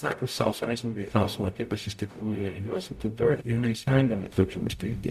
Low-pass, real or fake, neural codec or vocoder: 14.4 kHz; fake; codec, 44.1 kHz, 0.9 kbps, DAC